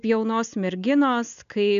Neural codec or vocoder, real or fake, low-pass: none; real; 7.2 kHz